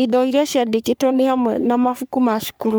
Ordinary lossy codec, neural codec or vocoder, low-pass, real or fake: none; codec, 44.1 kHz, 3.4 kbps, Pupu-Codec; none; fake